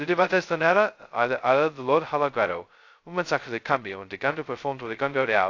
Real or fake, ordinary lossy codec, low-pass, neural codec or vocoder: fake; AAC, 48 kbps; 7.2 kHz; codec, 16 kHz, 0.2 kbps, FocalCodec